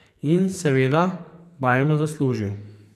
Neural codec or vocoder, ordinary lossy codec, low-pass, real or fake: codec, 44.1 kHz, 2.6 kbps, SNAC; none; 14.4 kHz; fake